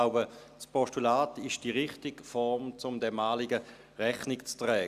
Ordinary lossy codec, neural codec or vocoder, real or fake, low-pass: Opus, 64 kbps; none; real; 14.4 kHz